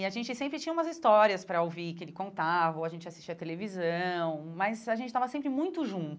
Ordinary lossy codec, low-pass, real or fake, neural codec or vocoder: none; none; real; none